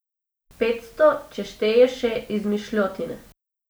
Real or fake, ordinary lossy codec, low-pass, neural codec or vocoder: real; none; none; none